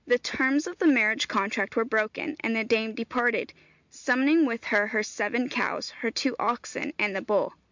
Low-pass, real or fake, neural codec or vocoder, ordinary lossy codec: 7.2 kHz; real; none; MP3, 64 kbps